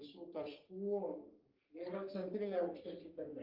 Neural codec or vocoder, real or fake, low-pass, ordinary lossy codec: codec, 44.1 kHz, 3.4 kbps, Pupu-Codec; fake; 5.4 kHz; Opus, 32 kbps